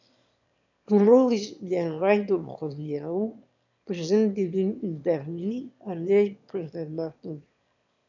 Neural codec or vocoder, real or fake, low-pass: autoencoder, 22.05 kHz, a latent of 192 numbers a frame, VITS, trained on one speaker; fake; 7.2 kHz